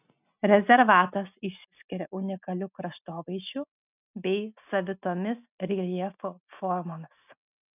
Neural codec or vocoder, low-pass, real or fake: none; 3.6 kHz; real